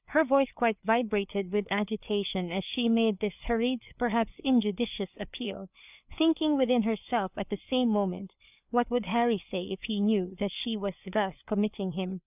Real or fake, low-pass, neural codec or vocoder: fake; 3.6 kHz; codec, 16 kHz, 4 kbps, FreqCodec, larger model